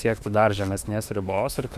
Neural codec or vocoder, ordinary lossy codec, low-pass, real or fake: autoencoder, 48 kHz, 32 numbers a frame, DAC-VAE, trained on Japanese speech; Opus, 64 kbps; 14.4 kHz; fake